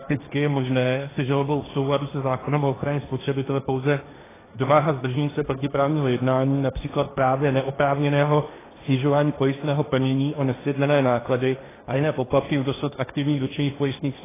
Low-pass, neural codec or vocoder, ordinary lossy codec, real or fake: 3.6 kHz; codec, 16 kHz, 1.1 kbps, Voila-Tokenizer; AAC, 16 kbps; fake